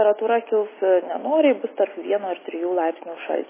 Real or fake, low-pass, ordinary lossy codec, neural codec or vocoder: real; 3.6 kHz; MP3, 16 kbps; none